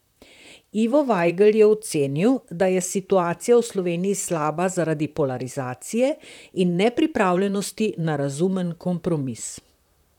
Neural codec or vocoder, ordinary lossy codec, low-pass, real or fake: vocoder, 44.1 kHz, 128 mel bands, Pupu-Vocoder; none; 19.8 kHz; fake